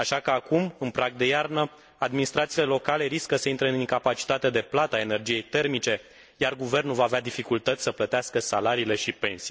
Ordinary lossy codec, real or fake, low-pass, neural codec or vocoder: none; real; none; none